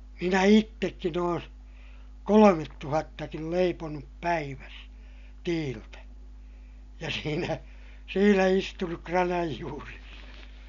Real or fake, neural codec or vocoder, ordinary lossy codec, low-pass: real; none; MP3, 96 kbps; 7.2 kHz